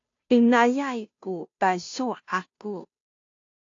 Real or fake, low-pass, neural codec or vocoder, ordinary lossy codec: fake; 7.2 kHz; codec, 16 kHz, 0.5 kbps, FunCodec, trained on Chinese and English, 25 frames a second; AAC, 48 kbps